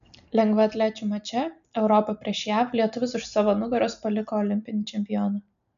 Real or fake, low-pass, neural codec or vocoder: real; 7.2 kHz; none